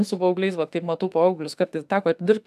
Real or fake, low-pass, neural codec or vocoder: fake; 14.4 kHz; autoencoder, 48 kHz, 32 numbers a frame, DAC-VAE, trained on Japanese speech